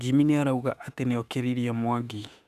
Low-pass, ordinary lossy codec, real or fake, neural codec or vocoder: 14.4 kHz; none; fake; autoencoder, 48 kHz, 32 numbers a frame, DAC-VAE, trained on Japanese speech